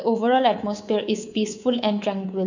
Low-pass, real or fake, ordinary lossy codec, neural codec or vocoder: 7.2 kHz; fake; none; codec, 24 kHz, 3.1 kbps, DualCodec